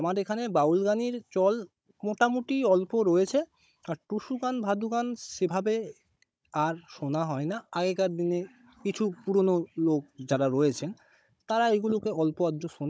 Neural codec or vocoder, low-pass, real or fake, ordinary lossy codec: codec, 16 kHz, 16 kbps, FunCodec, trained on Chinese and English, 50 frames a second; none; fake; none